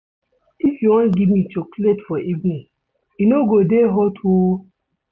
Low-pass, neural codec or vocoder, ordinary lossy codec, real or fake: none; none; none; real